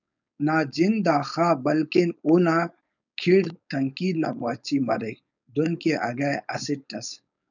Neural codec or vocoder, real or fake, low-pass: codec, 16 kHz, 4.8 kbps, FACodec; fake; 7.2 kHz